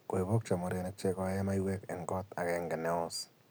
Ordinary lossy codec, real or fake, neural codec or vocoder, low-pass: none; real; none; none